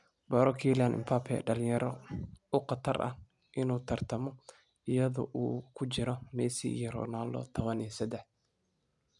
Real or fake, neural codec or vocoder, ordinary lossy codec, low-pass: real; none; none; 10.8 kHz